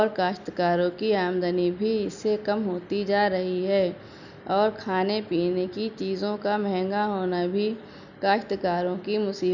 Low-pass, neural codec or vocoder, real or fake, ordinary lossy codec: 7.2 kHz; none; real; none